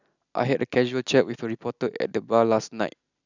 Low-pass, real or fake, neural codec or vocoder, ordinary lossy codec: 7.2 kHz; real; none; none